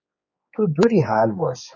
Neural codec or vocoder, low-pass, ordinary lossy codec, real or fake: codec, 16 kHz, 4 kbps, X-Codec, HuBERT features, trained on general audio; 7.2 kHz; MP3, 32 kbps; fake